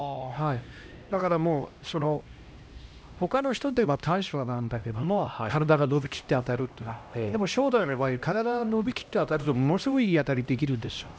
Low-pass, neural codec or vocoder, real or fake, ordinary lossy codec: none; codec, 16 kHz, 1 kbps, X-Codec, HuBERT features, trained on LibriSpeech; fake; none